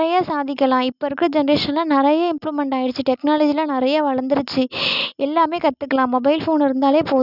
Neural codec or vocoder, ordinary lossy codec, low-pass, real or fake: none; none; 5.4 kHz; real